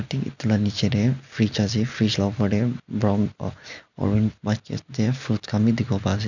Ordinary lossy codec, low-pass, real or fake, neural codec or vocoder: none; 7.2 kHz; real; none